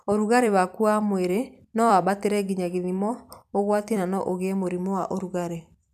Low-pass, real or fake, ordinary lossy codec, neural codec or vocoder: 14.4 kHz; fake; none; vocoder, 44.1 kHz, 128 mel bands every 256 samples, BigVGAN v2